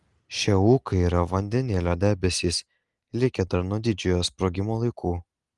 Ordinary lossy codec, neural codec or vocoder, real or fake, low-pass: Opus, 24 kbps; none; real; 10.8 kHz